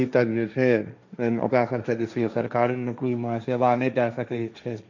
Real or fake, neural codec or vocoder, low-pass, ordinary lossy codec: fake; codec, 16 kHz, 1.1 kbps, Voila-Tokenizer; 7.2 kHz; none